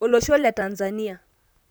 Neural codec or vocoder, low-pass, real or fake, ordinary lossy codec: vocoder, 44.1 kHz, 128 mel bands, Pupu-Vocoder; none; fake; none